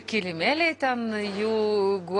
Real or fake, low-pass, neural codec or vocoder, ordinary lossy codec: real; 10.8 kHz; none; AAC, 32 kbps